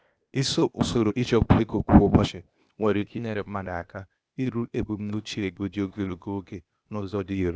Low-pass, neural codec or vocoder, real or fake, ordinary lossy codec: none; codec, 16 kHz, 0.8 kbps, ZipCodec; fake; none